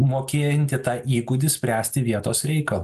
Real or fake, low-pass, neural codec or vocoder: real; 14.4 kHz; none